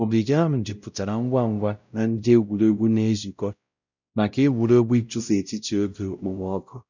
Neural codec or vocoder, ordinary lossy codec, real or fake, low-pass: codec, 16 kHz, 0.5 kbps, X-Codec, WavLM features, trained on Multilingual LibriSpeech; none; fake; 7.2 kHz